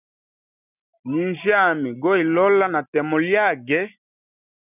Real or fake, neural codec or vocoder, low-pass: real; none; 3.6 kHz